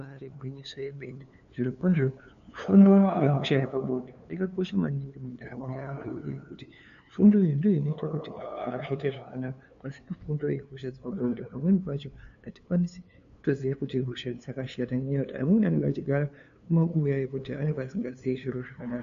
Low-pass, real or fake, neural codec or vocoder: 7.2 kHz; fake; codec, 16 kHz, 2 kbps, FunCodec, trained on LibriTTS, 25 frames a second